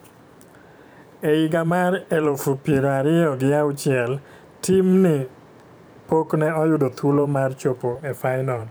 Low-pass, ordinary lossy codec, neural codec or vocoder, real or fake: none; none; vocoder, 44.1 kHz, 128 mel bands every 512 samples, BigVGAN v2; fake